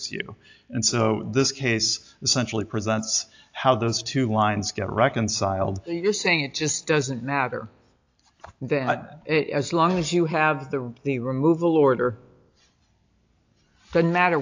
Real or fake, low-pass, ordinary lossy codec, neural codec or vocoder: real; 7.2 kHz; AAC, 48 kbps; none